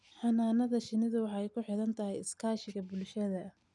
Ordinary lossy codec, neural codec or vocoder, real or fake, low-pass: none; none; real; 10.8 kHz